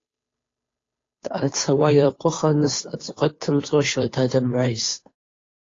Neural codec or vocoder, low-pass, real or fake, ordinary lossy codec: codec, 16 kHz, 2 kbps, FunCodec, trained on Chinese and English, 25 frames a second; 7.2 kHz; fake; AAC, 32 kbps